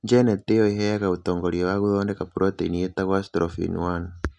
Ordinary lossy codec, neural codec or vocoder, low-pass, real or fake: none; none; 10.8 kHz; real